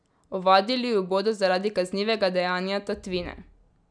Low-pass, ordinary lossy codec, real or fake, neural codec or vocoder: 9.9 kHz; none; real; none